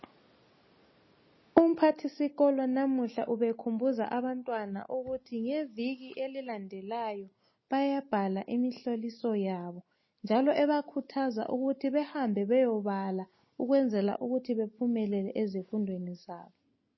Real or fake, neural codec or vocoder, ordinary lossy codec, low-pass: real; none; MP3, 24 kbps; 7.2 kHz